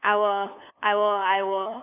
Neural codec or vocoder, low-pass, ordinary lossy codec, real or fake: codec, 16 kHz, 2 kbps, FunCodec, trained on LibriTTS, 25 frames a second; 3.6 kHz; none; fake